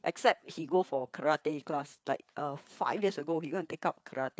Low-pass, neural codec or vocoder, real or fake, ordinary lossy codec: none; codec, 16 kHz, 4 kbps, FreqCodec, larger model; fake; none